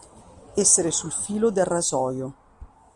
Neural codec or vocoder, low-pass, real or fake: none; 10.8 kHz; real